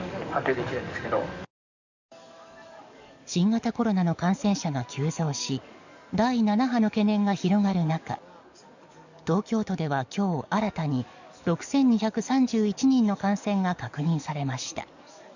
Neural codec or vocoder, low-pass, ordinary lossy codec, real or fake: codec, 44.1 kHz, 7.8 kbps, DAC; 7.2 kHz; none; fake